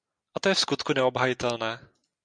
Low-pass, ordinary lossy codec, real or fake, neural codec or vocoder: 9.9 kHz; MP3, 96 kbps; real; none